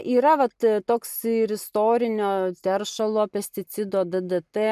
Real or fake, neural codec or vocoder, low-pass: real; none; 14.4 kHz